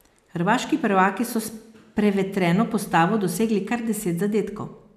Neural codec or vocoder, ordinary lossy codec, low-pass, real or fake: none; none; 14.4 kHz; real